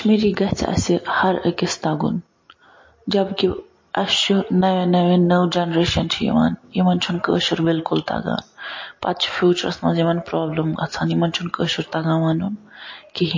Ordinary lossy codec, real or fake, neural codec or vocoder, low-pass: MP3, 32 kbps; real; none; 7.2 kHz